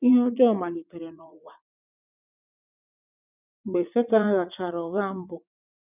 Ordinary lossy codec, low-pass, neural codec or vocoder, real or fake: none; 3.6 kHz; vocoder, 44.1 kHz, 80 mel bands, Vocos; fake